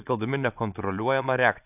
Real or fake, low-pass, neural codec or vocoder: fake; 3.6 kHz; vocoder, 22.05 kHz, 80 mel bands, Vocos